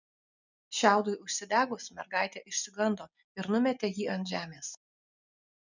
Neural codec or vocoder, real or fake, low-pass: none; real; 7.2 kHz